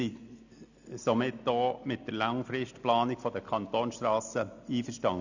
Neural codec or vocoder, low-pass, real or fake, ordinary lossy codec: none; 7.2 kHz; real; none